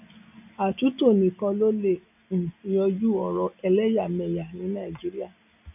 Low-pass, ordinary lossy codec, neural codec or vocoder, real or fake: 3.6 kHz; none; none; real